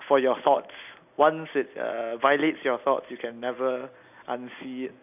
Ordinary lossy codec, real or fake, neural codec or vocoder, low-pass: none; real; none; 3.6 kHz